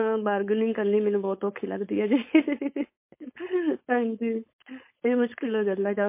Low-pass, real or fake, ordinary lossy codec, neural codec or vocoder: 3.6 kHz; fake; MP3, 24 kbps; codec, 16 kHz, 4.8 kbps, FACodec